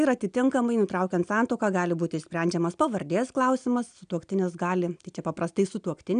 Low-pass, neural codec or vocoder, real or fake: 9.9 kHz; none; real